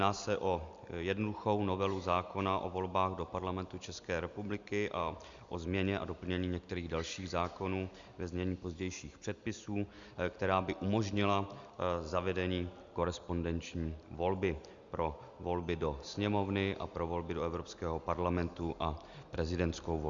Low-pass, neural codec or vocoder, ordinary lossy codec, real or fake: 7.2 kHz; none; Opus, 64 kbps; real